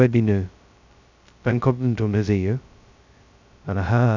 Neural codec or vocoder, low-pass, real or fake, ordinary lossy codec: codec, 16 kHz, 0.2 kbps, FocalCodec; 7.2 kHz; fake; none